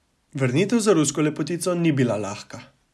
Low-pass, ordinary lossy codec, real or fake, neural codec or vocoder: none; none; real; none